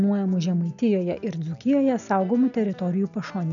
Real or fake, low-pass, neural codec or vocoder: real; 7.2 kHz; none